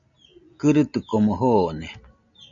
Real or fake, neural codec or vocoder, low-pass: real; none; 7.2 kHz